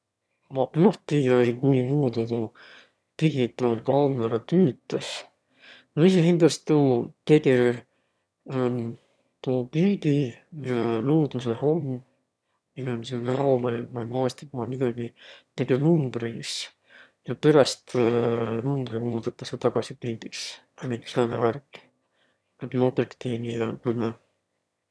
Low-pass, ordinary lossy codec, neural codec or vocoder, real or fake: none; none; autoencoder, 22.05 kHz, a latent of 192 numbers a frame, VITS, trained on one speaker; fake